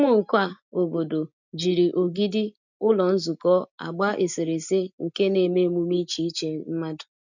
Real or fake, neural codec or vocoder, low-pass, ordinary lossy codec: real; none; 7.2 kHz; none